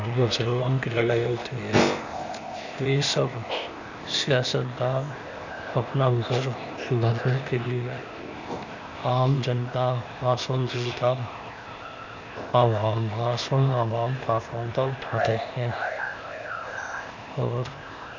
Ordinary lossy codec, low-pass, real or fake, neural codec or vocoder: none; 7.2 kHz; fake; codec, 16 kHz, 0.8 kbps, ZipCodec